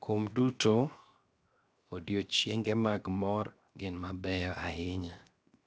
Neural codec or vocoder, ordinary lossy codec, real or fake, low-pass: codec, 16 kHz, 0.7 kbps, FocalCodec; none; fake; none